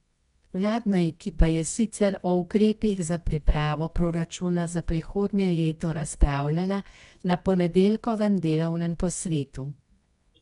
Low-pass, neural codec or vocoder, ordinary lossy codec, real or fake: 10.8 kHz; codec, 24 kHz, 0.9 kbps, WavTokenizer, medium music audio release; none; fake